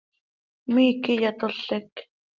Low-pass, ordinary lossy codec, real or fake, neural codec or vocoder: 7.2 kHz; Opus, 24 kbps; real; none